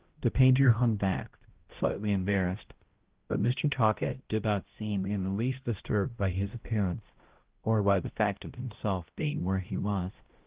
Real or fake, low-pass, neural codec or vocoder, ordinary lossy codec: fake; 3.6 kHz; codec, 16 kHz, 0.5 kbps, X-Codec, HuBERT features, trained on balanced general audio; Opus, 16 kbps